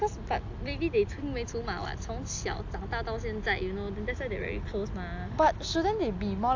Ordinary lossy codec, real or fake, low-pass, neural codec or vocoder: AAC, 48 kbps; real; 7.2 kHz; none